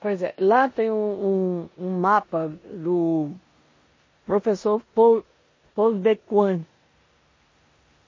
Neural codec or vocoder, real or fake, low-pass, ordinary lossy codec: codec, 16 kHz in and 24 kHz out, 0.9 kbps, LongCat-Audio-Codec, four codebook decoder; fake; 7.2 kHz; MP3, 32 kbps